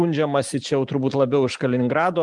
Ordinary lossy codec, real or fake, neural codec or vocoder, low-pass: Opus, 32 kbps; real; none; 10.8 kHz